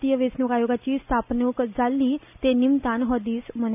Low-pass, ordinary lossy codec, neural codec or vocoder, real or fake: 3.6 kHz; none; none; real